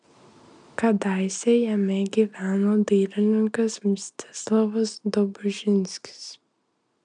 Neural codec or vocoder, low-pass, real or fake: none; 9.9 kHz; real